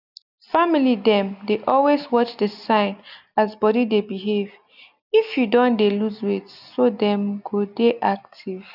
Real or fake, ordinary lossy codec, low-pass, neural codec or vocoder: real; none; 5.4 kHz; none